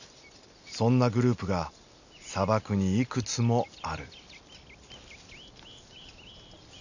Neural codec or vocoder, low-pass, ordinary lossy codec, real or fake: none; 7.2 kHz; none; real